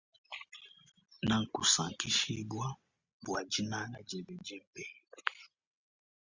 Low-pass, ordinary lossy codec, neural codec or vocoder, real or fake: 7.2 kHz; Opus, 64 kbps; none; real